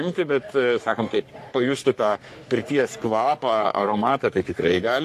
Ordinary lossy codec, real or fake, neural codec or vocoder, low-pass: AAC, 64 kbps; fake; codec, 44.1 kHz, 3.4 kbps, Pupu-Codec; 14.4 kHz